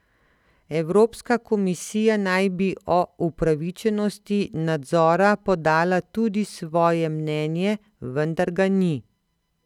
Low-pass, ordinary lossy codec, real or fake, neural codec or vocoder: 19.8 kHz; none; real; none